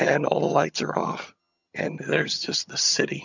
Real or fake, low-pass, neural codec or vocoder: fake; 7.2 kHz; vocoder, 22.05 kHz, 80 mel bands, HiFi-GAN